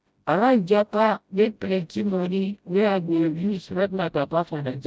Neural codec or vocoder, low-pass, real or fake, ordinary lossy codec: codec, 16 kHz, 0.5 kbps, FreqCodec, smaller model; none; fake; none